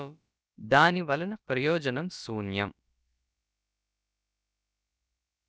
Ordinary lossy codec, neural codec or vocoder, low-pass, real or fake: none; codec, 16 kHz, about 1 kbps, DyCAST, with the encoder's durations; none; fake